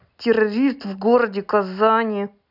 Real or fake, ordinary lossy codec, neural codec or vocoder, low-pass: real; none; none; 5.4 kHz